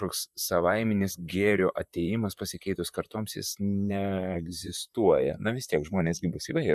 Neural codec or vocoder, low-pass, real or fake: vocoder, 44.1 kHz, 128 mel bands, Pupu-Vocoder; 14.4 kHz; fake